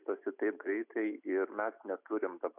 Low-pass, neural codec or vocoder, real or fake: 3.6 kHz; none; real